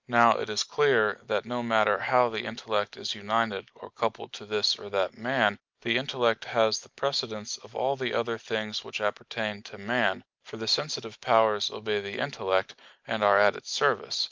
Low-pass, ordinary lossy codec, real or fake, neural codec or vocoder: 7.2 kHz; Opus, 32 kbps; real; none